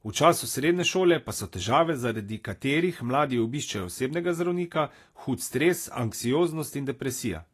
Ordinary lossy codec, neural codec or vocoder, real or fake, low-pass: AAC, 48 kbps; none; real; 14.4 kHz